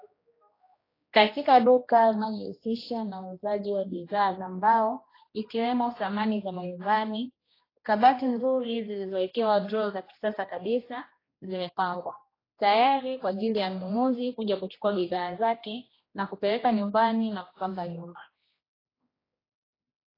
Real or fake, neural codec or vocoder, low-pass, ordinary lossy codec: fake; codec, 16 kHz, 1 kbps, X-Codec, HuBERT features, trained on general audio; 5.4 kHz; AAC, 24 kbps